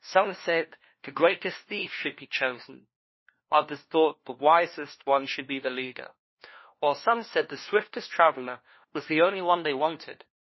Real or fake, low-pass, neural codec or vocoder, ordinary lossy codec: fake; 7.2 kHz; codec, 16 kHz, 1 kbps, FunCodec, trained on LibriTTS, 50 frames a second; MP3, 24 kbps